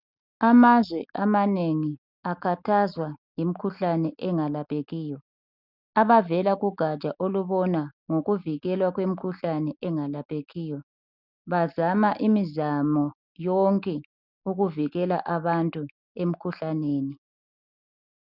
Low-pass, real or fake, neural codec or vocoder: 5.4 kHz; real; none